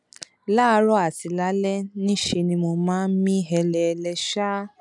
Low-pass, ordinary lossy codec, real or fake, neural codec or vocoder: 10.8 kHz; none; real; none